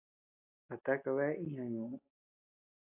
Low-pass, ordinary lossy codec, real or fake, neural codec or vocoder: 3.6 kHz; AAC, 24 kbps; real; none